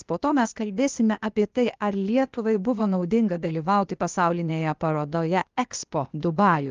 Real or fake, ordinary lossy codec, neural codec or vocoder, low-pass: fake; Opus, 32 kbps; codec, 16 kHz, 0.8 kbps, ZipCodec; 7.2 kHz